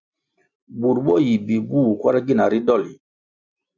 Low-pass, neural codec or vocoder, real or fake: 7.2 kHz; none; real